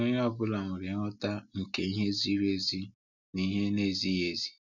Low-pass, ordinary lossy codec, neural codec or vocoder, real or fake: 7.2 kHz; none; none; real